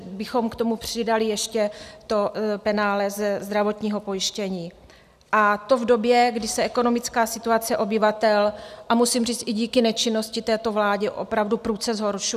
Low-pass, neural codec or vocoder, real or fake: 14.4 kHz; none; real